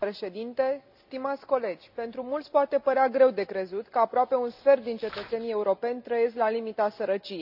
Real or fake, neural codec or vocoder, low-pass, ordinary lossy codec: real; none; 5.4 kHz; none